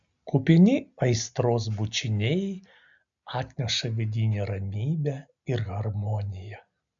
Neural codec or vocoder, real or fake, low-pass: none; real; 7.2 kHz